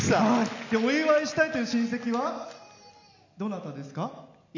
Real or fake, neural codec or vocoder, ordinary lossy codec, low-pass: real; none; none; 7.2 kHz